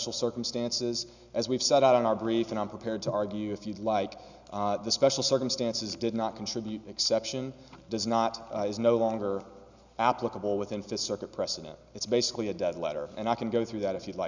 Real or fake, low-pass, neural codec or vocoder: real; 7.2 kHz; none